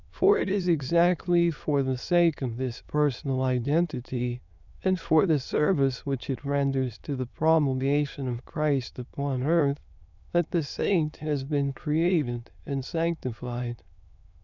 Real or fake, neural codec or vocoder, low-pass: fake; autoencoder, 22.05 kHz, a latent of 192 numbers a frame, VITS, trained on many speakers; 7.2 kHz